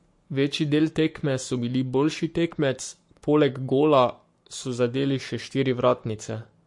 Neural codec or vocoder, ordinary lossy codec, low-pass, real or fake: codec, 44.1 kHz, 7.8 kbps, Pupu-Codec; MP3, 48 kbps; 10.8 kHz; fake